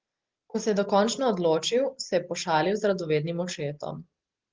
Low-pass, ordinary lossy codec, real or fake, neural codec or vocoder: 7.2 kHz; Opus, 16 kbps; real; none